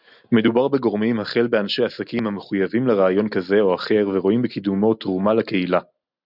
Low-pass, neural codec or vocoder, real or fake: 5.4 kHz; none; real